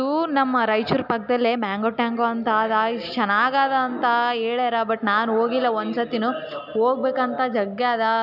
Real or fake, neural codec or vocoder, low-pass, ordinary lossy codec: real; none; 5.4 kHz; none